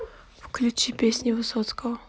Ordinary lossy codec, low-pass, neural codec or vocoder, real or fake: none; none; none; real